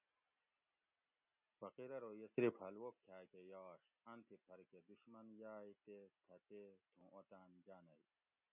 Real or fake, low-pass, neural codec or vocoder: real; 3.6 kHz; none